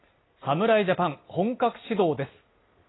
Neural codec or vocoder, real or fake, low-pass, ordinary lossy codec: none; real; 7.2 kHz; AAC, 16 kbps